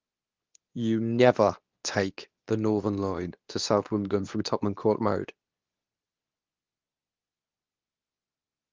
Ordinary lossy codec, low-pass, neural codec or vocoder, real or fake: Opus, 24 kbps; 7.2 kHz; codec, 24 kHz, 0.9 kbps, WavTokenizer, medium speech release version 2; fake